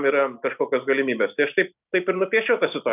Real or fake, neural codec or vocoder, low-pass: real; none; 3.6 kHz